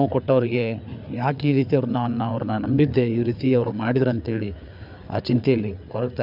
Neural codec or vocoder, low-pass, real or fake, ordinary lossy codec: codec, 16 kHz, 4 kbps, FreqCodec, larger model; 5.4 kHz; fake; none